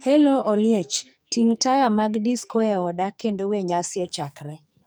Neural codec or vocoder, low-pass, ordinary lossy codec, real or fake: codec, 44.1 kHz, 2.6 kbps, SNAC; none; none; fake